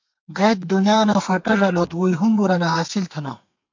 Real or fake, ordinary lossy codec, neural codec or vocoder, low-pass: fake; MP3, 48 kbps; codec, 32 kHz, 1.9 kbps, SNAC; 7.2 kHz